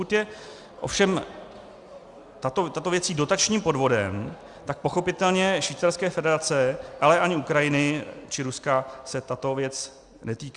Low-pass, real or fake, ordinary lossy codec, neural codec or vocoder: 10.8 kHz; real; Opus, 64 kbps; none